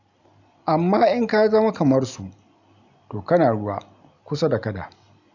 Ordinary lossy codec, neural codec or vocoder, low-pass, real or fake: none; none; 7.2 kHz; real